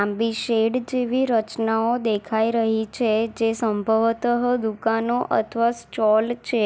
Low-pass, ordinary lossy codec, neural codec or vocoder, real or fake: none; none; none; real